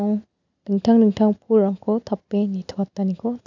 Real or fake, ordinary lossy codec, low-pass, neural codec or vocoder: real; none; 7.2 kHz; none